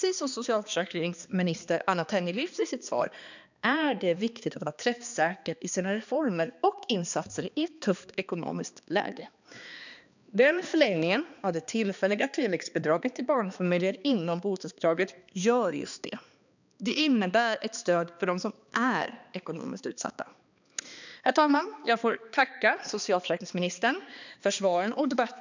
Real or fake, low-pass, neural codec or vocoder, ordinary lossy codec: fake; 7.2 kHz; codec, 16 kHz, 2 kbps, X-Codec, HuBERT features, trained on balanced general audio; none